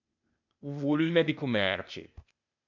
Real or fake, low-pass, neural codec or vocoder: fake; 7.2 kHz; codec, 16 kHz, 0.8 kbps, ZipCodec